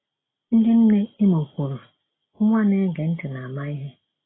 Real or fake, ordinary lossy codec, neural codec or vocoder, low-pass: real; AAC, 16 kbps; none; 7.2 kHz